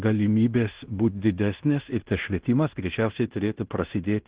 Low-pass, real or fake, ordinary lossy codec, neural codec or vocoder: 3.6 kHz; fake; Opus, 16 kbps; codec, 16 kHz in and 24 kHz out, 0.9 kbps, LongCat-Audio-Codec, fine tuned four codebook decoder